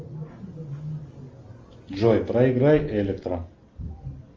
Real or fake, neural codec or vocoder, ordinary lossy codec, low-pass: real; none; Opus, 32 kbps; 7.2 kHz